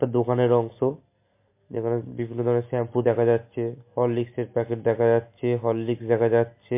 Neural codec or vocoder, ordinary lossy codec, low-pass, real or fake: none; MP3, 24 kbps; 3.6 kHz; real